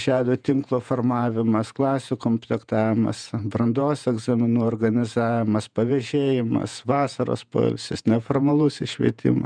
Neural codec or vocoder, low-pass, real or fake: vocoder, 48 kHz, 128 mel bands, Vocos; 9.9 kHz; fake